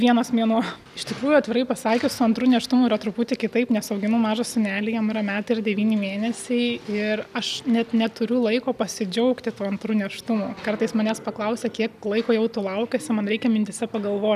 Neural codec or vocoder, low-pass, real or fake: none; 14.4 kHz; real